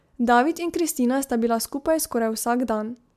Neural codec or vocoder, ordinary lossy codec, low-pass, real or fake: none; MP3, 96 kbps; 14.4 kHz; real